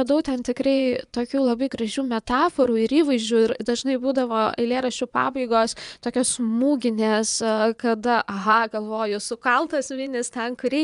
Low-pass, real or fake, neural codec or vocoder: 10.8 kHz; fake; vocoder, 24 kHz, 100 mel bands, Vocos